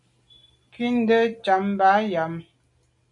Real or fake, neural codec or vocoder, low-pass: real; none; 10.8 kHz